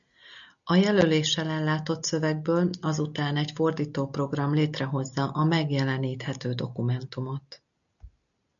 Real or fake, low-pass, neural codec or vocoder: real; 7.2 kHz; none